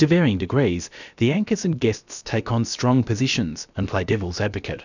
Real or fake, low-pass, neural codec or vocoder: fake; 7.2 kHz; codec, 16 kHz, about 1 kbps, DyCAST, with the encoder's durations